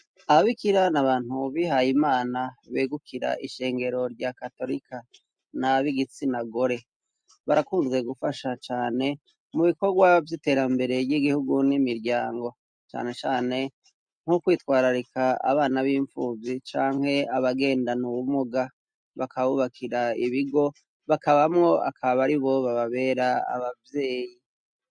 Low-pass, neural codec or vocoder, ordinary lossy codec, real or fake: 9.9 kHz; none; MP3, 64 kbps; real